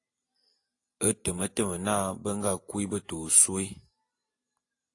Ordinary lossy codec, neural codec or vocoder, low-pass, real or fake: AAC, 48 kbps; none; 10.8 kHz; real